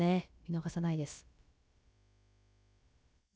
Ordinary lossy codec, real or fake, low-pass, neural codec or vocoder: none; fake; none; codec, 16 kHz, about 1 kbps, DyCAST, with the encoder's durations